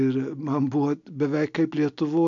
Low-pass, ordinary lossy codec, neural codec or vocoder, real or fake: 7.2 kHz; AAC, 64 kbps; none; real